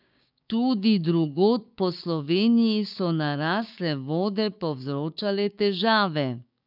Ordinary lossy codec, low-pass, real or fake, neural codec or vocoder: none; 5.4 kHz; fake; codec, 16 kHz, 6 kbps, DAC